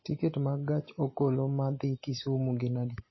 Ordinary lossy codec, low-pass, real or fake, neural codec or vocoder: MP3, 24 kbps; 7.2 kHz; real; none